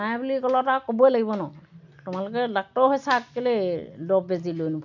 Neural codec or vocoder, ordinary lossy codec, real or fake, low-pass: none; none; real; 7.2 kHz